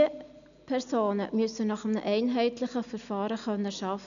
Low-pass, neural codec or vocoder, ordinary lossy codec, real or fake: 7.2 kHz; none; none; real